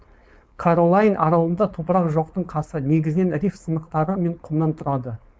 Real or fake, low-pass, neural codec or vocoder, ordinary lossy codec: fake; none; codec, 16 kHz, 4.8 kbps, FACodec; none